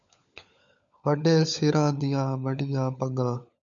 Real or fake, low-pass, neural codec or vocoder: fake; 7.2 kHz; codec, 16 kHz, 16 kbps, FunCodec, trained on LibriTTS, 50 frames a second